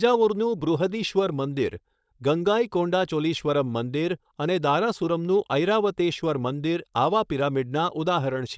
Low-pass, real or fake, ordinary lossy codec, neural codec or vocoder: none; fake; none; codec, 16 kHz, 4.8 kbps, FACodec